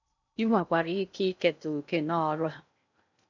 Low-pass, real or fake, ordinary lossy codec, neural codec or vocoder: 7.2 kHz; fake; none; codec, 16 kHz in and 24 kHz out, 0.6 kbps, FocalCodec, streaming, 2048 codes